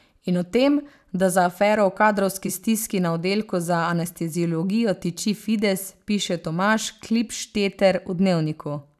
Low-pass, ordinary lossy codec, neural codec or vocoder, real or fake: 14.4 kHz; none; vocoder, 44.1 kHz, 128 mel bands every 256 samples, BigVGAN v2; fake